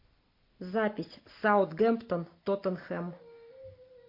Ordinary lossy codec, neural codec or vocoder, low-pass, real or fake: MP3, 32 kbps; none; 5.4 kHz; real